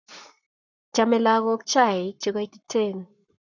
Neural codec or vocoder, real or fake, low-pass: codec, 44.1 kHz, 7.8 kbps, Pupu-Codec; fake; 7.2 kHz